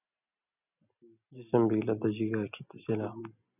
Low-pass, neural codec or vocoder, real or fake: 3.6 kHz; none; real